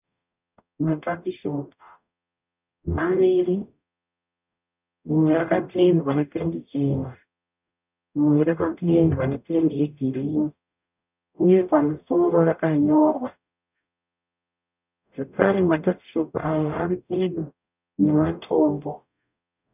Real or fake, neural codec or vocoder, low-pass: fake; codec, 44.1 kHz, 0.9 kbps, DAC; 3.6 kHz